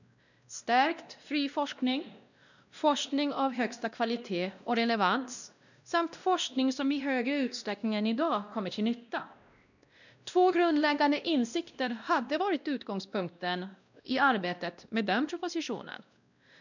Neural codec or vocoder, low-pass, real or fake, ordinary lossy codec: codec, 16 kHz, 1 kbps, X-Codec, WavLM features, trained on Multilingual LibriSpeech; 7.2 kHz; fake; none